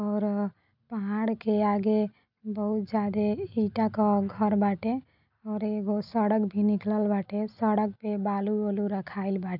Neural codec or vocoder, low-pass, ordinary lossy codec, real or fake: none; 5.4 kHz; none; real